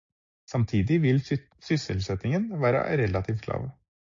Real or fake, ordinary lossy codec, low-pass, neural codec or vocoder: real; AAC, 48 kbps; 7.2 kHz; none